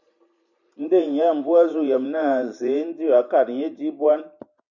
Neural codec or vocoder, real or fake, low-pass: vocoder, 44.1 kHz, 128 mel bands every 512 samples, BigVGAN v2; fake; 7.2 kHz